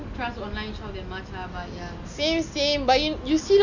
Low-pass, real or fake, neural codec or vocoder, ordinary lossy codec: 7.2 kHz; real; none; none